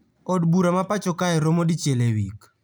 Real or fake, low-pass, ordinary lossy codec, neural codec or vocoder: real; none; none; none